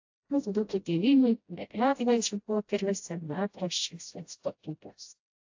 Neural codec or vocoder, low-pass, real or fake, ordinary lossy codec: codec, 16 kHz, 0.5 kbps, FreqCodec, smaller model; 7.2 kHz; fake; AAC, 48 kbps